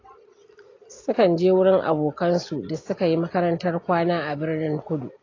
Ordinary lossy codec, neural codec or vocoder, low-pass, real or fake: AAC, 32 kbps; none; 7.2 kHz; real